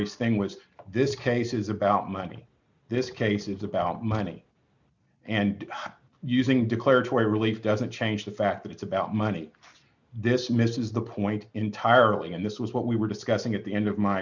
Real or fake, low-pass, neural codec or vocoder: real; 7.2 kHz; none